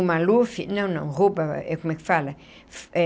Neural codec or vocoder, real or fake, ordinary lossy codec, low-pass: none; real; none; none